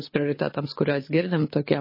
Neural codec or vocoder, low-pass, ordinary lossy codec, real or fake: codec, 24 kHz, 6 kbps, HILCodec; 5.4 kHz; MP3, 24 kbps; fake